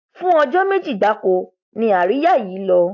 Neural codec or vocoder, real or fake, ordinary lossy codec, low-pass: none; real; AAC, 48 kbps; 7.2 kHz